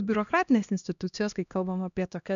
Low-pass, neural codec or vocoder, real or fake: 7.2 kHz; codec, 16 kHz, 1 kbps, X-Codec, HuBERT features, trained on LibriSpeech; fake